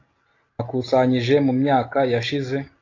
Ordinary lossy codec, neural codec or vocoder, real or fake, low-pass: AAC, 32 kbps; none; real; 7.2 kHz